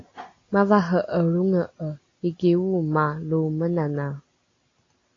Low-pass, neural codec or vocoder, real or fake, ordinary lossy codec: 7.2 kHz; none; real; AAC, 32 kbps